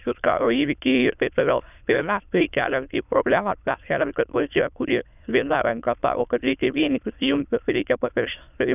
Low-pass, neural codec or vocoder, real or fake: 3.6 kHz; autoencoder, 22.05 kHz, a latent of 192 numbers a frame, VITS, trained on many speakers; fake